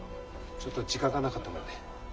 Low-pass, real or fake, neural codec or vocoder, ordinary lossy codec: none; real; none; none